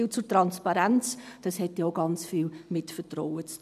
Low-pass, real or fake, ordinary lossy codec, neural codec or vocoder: 14.4 kHz; real; none; none